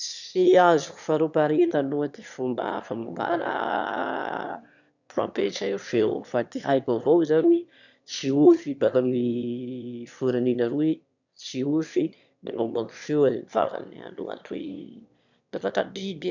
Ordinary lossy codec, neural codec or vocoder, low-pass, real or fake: none; autoencoder, 22.05 kHz, a latent of 192 numbers a frame, VITS, trained on one speaker; 7.2 kHz; fake